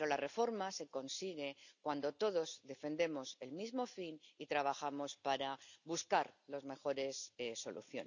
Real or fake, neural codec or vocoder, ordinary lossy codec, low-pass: real; none; none; 7.2 kHz